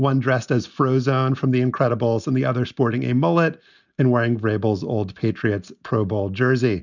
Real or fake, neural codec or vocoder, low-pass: real; none; 7.2 kHz